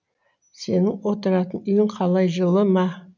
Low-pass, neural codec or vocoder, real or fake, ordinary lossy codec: 7.2 kHz; none; real; none